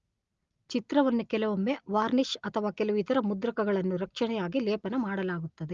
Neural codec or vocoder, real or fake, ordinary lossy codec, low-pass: none; real; Opus, 32 kbps; 7.2 kHz